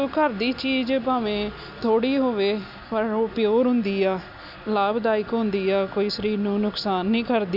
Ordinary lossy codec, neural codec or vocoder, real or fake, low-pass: none; none; real; 5.4 kHz